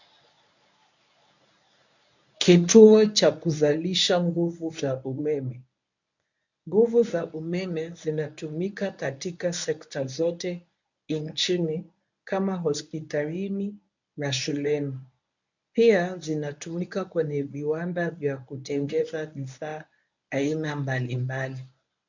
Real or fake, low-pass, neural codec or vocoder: fake; 7.2 kHz; codec, 24 kHz, 0.9 kbps, WavTokenizer, medium speech release version 1